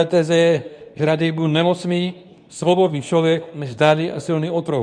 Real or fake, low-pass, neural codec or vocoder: fake; 9.9 kHz; codec, 24 kHz, 0.9 kbps, WavTokenizer, medium speech release version 2